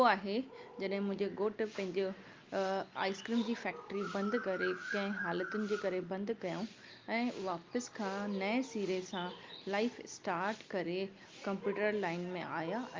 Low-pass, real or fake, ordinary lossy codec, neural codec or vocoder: 7.2 kHz; real; Opus, 24 kbps; none